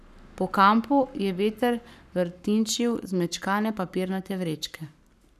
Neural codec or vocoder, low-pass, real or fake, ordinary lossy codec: codec, 44.1 kHz, 7.8 kbps, Pupu-Codec; 14.4 kHz; fake; none